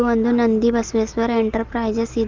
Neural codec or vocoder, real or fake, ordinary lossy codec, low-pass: none; real; Opus, 16 kbps; 7.2 kHz